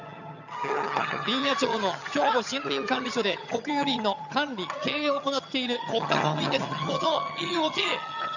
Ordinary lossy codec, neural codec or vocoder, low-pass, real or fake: none; vocoder, 22.05 kHz, 80 mel bands, HiFi-GAN; 7.2 kHz; fake